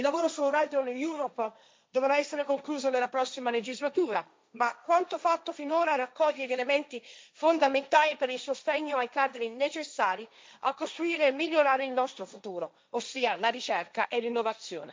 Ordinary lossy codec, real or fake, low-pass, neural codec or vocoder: none; fake; none; codec, 16 kHz, 1.1 kbps, Voila-Tokenizer